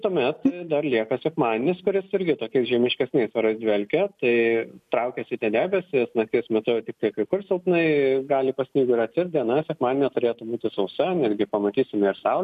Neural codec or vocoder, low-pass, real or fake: none; 14.4 kHz; real